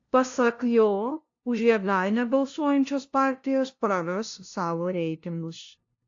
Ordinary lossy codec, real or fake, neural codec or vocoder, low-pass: AAC, 48 kbps; fake; codec, 16 kHz, 0.5 kbps, FunCodec, trained on LibriTTS, 25 frames a second; 7.2 kHz